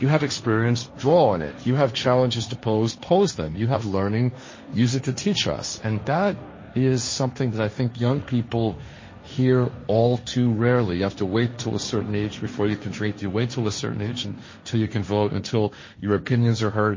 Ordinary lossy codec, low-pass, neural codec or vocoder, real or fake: MP3, 32 kbps; 7.2 kHz; codec, 16 kHz, 1.1 kbps, Voila-Tokenizer; fake